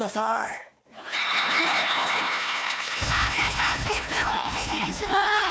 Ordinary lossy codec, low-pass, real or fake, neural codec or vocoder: none; none; fake; codec, 16 kHz, 1 kbps, FunCodec, trained on Chinese and English, 50 frames a second